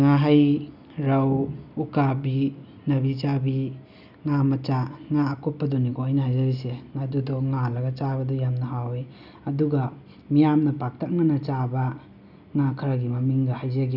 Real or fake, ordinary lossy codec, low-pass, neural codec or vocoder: real; none; 5.4 kHz; none